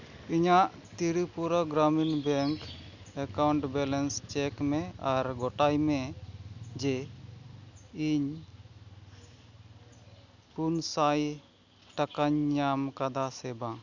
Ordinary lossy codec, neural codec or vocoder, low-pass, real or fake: none; none; 7.2 kHz; real